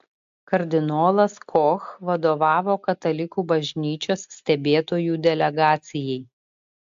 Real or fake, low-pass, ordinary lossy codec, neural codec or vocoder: real; 7.2 kHz; AAC, 64 kbps; none